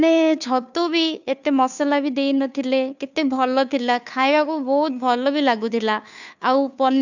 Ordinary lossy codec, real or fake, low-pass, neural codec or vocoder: none; fake; 7.2 kHz; codec, 16 kHz, 2 kbps, FunCodec, trained on Chinese and English, 25 frames a second